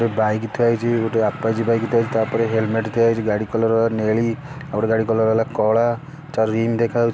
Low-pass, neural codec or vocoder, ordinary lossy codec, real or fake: none; none; none; real